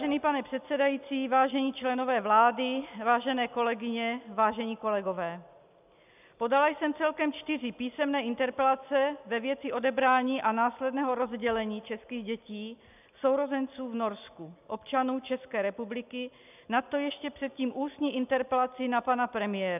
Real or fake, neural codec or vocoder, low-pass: real; none; 3.6 kHz